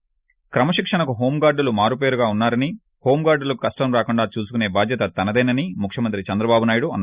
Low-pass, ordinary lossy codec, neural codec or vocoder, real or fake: 3.6 kHz; Opus, 64 kbps; none; real